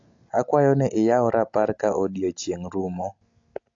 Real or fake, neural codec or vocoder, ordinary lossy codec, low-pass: real; none; AAC, 48 kbps; 7.2 kHz